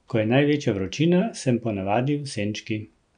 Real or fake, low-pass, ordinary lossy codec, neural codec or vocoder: real; 9.9 kHz; none; none